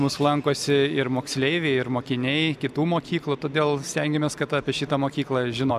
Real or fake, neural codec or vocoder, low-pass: real; none; 14.4 kHz